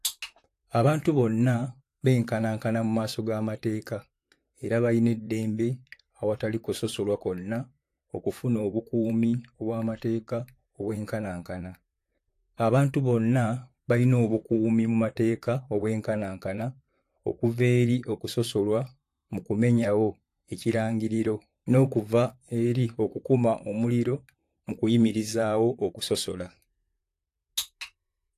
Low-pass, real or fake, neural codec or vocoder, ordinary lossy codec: 14.4 kHz; fake; vocoder, 44.1 kHz, 128 mel bands, Pupu-Vocoder; AAC, 64 kbps